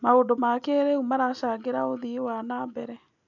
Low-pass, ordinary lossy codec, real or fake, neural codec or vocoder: 7.2 kHz; none; real; none